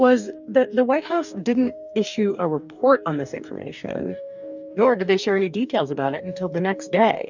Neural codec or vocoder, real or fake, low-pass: codec, 44.1 kHz, 2.6 kbps, DAC; fake; 7.2 kHz